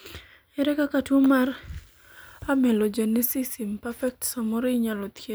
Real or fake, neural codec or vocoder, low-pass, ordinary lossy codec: real; none; none; none